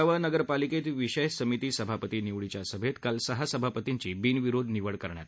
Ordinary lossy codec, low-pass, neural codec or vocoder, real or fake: none; none; none; real